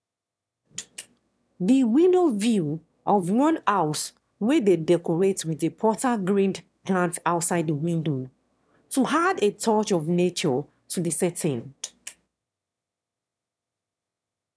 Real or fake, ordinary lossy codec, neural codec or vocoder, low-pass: fake; none; autoencoder, 22.05 kHz, a latent of 192 numbers a frame, VITS, trained on one speaker; none